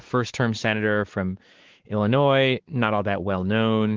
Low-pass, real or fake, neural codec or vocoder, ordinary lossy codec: 7.2 kHz; fake; codec, 16 kHz, 4 kbps, X-Codec, WavLM features, trained on Multilingual LibriSpeech; Opus, 16 kbps